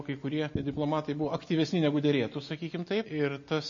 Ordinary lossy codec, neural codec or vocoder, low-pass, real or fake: MP3, 32 kbps; none; 7.2 kHz; real